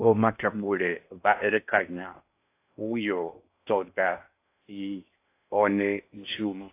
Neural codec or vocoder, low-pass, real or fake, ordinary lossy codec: codec, 16 kHz in and 24 kHz out, 0.6 kbps, FocalCodec, streaming, 4096 codes; 3.6 kHz; fake; AAC, 24 kbps